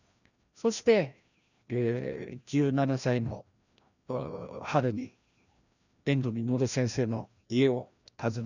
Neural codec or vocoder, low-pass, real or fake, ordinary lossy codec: codec, 16 kHz, 1 kbps, FreqCodec, larger model; 7.2 kHz; fake; none